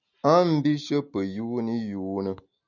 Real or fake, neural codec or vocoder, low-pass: real; none; 7.2 kHz